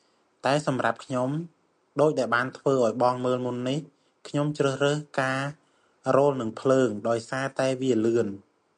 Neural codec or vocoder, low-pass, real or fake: none; 9.9 kHz; real